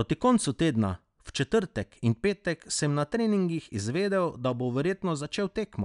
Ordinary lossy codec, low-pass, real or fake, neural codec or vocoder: none; 10.8 kHz; real; none